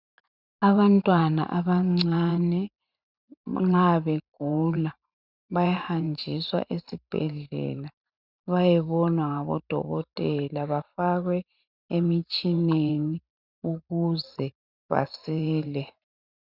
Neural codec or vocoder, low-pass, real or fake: vocoder, 44.1 kHz, 128 mel bands every 512 samples, BigVGAN v2; 5.4 kHz; fake